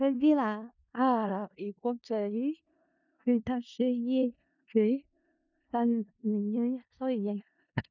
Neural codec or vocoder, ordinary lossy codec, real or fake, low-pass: codec, 16 kHz in and 24 kHz out, 0.4 kbps, LongCat-Audio-Codec, four codebook decoder; none; fake; 7.2 kHz